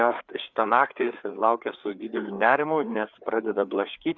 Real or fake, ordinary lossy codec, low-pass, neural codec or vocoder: fake; Opus, 64 kbps; 7.2 kHz; codec, 16 kHz, 4 kbps, FreqCodec, larger model